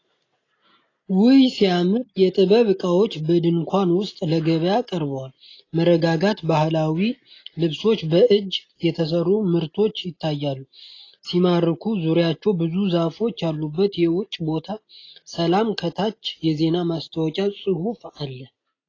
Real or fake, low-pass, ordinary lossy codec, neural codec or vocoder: real; 7.2 kHz; AAC, 32 kbps; none